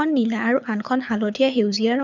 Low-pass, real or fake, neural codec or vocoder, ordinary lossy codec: 7.2 kHz; fake; codec, 24 kHz, 6 kbps, HILCodec; none